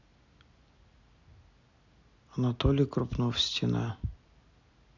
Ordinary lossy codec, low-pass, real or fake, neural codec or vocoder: none; 7.2 kHz; real; none